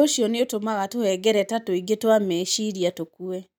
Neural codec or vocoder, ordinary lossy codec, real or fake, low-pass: none; none; real; none